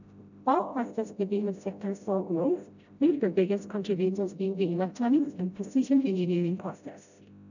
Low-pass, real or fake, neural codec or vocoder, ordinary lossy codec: 7.2 kHz; fake; codec, 16 kHz, 0.5 kbps, FreqCodec, smaller model; none